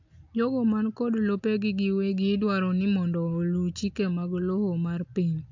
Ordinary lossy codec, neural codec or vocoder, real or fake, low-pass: AAC, 48 kbps; none; real; 7.2 kHz